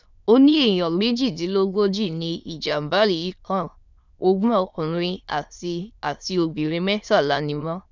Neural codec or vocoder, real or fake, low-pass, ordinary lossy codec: autoencoder, 22.05 kHz, a latent of 192 numbers a frame, VITS, trained on many speakers; fake; 7.2 kHz; none